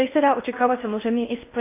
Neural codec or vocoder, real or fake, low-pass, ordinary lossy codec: codec, 16 kHz in and 24 kHz out, 0.6 kbps, FocalCodec, streaming, 2048 codes; fake; 3.6 kHz; AAC, 24 kbps